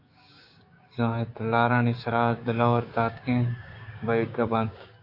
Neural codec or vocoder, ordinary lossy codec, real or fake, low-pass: codec, 16 kHz, 6 kbps, DAC; AAC, 32 kbps; fake; 5.4 kHz